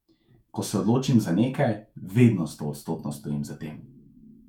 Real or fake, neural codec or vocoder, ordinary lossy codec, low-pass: fake; autoencoder, 48 kHz, 128 numbers a frame, DAC-VAE, trained on Japanese speech; MP3, 96 kbps; 19.8 kHz